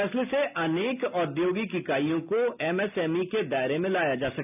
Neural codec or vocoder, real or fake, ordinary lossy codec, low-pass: none; real; none; 3.6 kHz